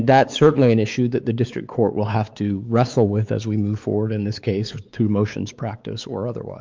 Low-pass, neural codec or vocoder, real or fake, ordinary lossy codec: 7.2 kHz; codec, 16 kHz, 4 kbps, X-Codec, WavLM features, trained on Multilingual LibriSpeech; fake; Opus, 24 kbps